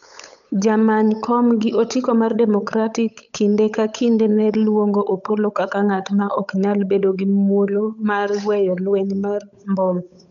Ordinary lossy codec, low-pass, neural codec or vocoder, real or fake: none; 7.2 kHz; codec, 16 kHz, 8 kbps, FunCodec, trained on Chinese and English, 25 frames a second; fake